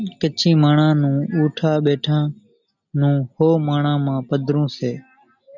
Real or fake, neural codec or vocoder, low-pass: real; none; 7.2 kHz